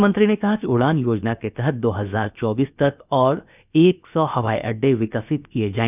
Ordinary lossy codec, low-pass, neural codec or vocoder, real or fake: none; 3.6 kHz; codec, 16 kHz, about 1 kbps, DyCAST, with the encoder's durations; fake